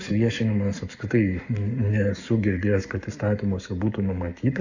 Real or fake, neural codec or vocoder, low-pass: fake; codec, 44.1 kHz, 7.8 kbps, Pupu-Codec; 7.2 kHz